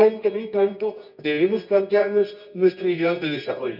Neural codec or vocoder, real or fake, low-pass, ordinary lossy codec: codec, 24 kHz, 0.9 kbps, WavTokenizer, medium music audio release; fake; 5.4 kHz; none